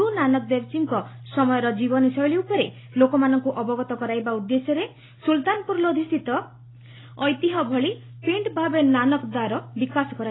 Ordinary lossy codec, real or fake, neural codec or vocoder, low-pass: AAC, 16 kbps; real; none; 7.2 kHz